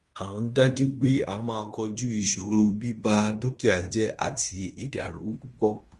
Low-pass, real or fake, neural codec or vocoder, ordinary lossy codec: 10.8 kHz; fake; codec, 16 kHz in and 24 kHz out, 0.9 kbps, LongCat-Audio-Codec, fine tuned four codebook decoder; Opus, 32 kbps